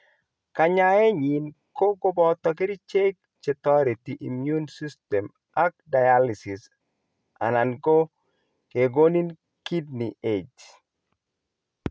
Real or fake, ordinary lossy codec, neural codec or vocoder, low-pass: real; none; none; none